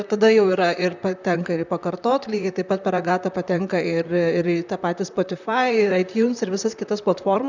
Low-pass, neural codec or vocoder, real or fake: 7.2 kHz; vocoder, 44.1 kHz, 128 mel bands, Pupu-Vocoder; fake